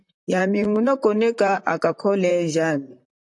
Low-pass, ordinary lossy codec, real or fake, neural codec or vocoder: 10.8 kHz; AAC, 64 kbps; fake; vocoder, 44.1 kHz, 128 mel bands, Pupu-Vocoder